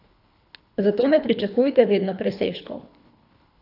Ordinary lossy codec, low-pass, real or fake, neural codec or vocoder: none; 5.4 kHz; fake; codec, 24 kHz, 3 kbps, HILCodec